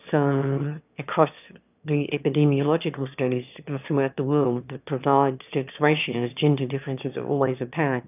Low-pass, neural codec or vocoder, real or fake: 3.6 kHz; autoencoder, 22.05 kHz, a latent of 192 numbers a frame, VITS, trained on one speaker; fake